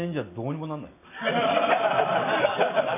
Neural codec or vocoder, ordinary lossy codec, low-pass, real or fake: none; none; 3.6 kHz; real